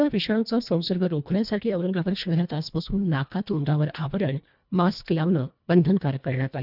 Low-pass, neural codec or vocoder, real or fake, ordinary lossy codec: 5.4 kHz; codec, 24 kHz, 1.5 kbps, HILCodec; fake; none